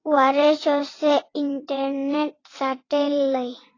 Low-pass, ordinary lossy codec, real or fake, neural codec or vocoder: 7.2 kHz; AAC, 32 kbps; fake; vocoder, 22.05 kHz, 80 mel bands, WaveNeXt